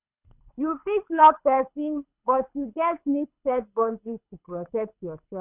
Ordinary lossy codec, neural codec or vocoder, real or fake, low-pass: none; codec, 24 kHz, 6 kbps, HILCodec; fake; 3.6 kHz